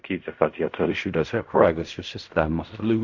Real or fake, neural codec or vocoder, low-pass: fake; codec, 16 kHz in and 24 kHz out, 0.4 kbps, LongCat-Audio-Codec, fine tuned four codebook decoder; 7.2 kHz